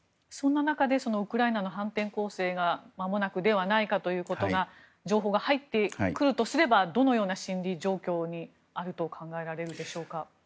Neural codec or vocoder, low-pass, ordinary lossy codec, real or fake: none; none; none; real